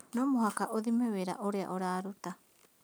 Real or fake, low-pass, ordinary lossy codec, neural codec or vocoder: real; none; none; none